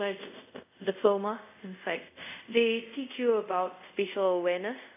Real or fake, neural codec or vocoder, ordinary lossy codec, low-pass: fake; codec, 24 kHz, 0.5 kbps, DualCodec; AAC, 32 kbps; 3.6 kHz